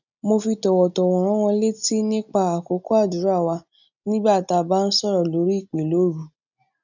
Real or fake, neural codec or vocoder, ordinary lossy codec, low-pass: real; none; none; 7.2 kHz